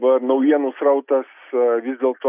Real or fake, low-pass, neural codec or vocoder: real; 3.6 kHz; none